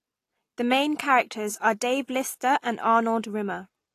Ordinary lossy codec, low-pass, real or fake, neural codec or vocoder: AAC, 48 kbps; 14.4 kHz; real; none